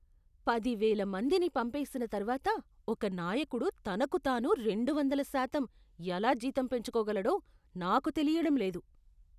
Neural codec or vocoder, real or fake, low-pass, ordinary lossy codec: none; real; 14.4 kHz; none